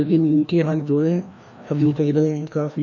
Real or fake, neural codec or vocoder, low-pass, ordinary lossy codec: fake; codec, 16 kHz, 1 kbps, FreqCodec, larger model; 7.2 kHz; none